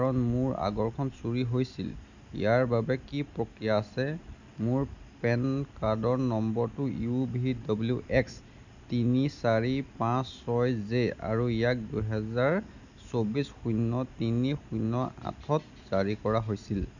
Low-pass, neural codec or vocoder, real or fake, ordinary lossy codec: 7.2 kHz; none; real; none